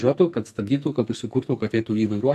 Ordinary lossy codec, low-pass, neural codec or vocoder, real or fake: MP3, 96 kbps; 14.4 kHz; codec, 44.1 kHz, 2.6 kbps, SNAC; fake